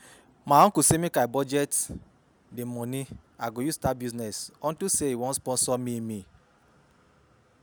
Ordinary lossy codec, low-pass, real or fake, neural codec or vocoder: none; none; real; none